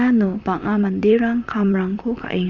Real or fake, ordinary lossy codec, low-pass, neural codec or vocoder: fake; none; 7.2 kHz; vocoder, 44.1 kHz, 128 mel bands, Pupu-Vocoder